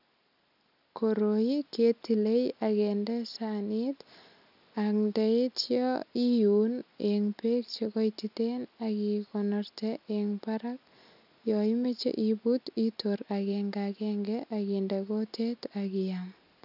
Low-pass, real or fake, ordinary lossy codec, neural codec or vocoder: 5.4 kHz; real; none; none